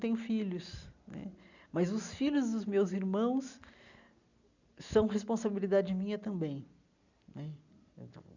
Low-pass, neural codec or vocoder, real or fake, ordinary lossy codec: 7.2 kHz; none; real; none